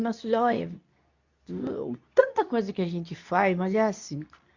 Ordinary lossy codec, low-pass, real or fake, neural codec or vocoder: none; 7.2 kHz; fake; codec, 24 kHz, 0.9 kbps, WavTokenizer, medium speech release version 2